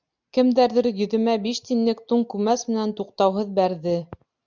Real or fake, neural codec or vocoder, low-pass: real; none; 7.2 kHz